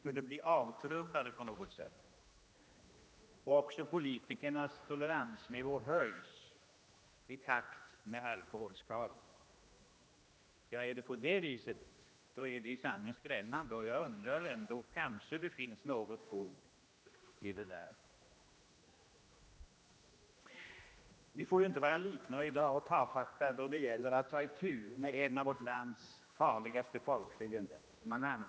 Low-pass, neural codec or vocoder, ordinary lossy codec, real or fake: none; codec, 16 kHz, 1 kbps, X-Codec, HuBERT features, trained on general audio; none; fake